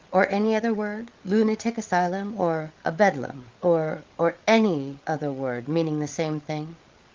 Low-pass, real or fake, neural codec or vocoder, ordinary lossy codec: 7.2 kHz; fake; codec, 16 kHz, 8 kbps, FreqCodec, larger model; Opus, 32 kbps